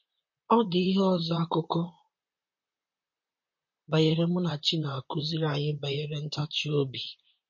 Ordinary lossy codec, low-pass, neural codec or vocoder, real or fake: MP3, 32 kbps; 7.2 kHz; vocoder, 44.1 kHz, 128 mel bands, Pupu-Vocoder; fake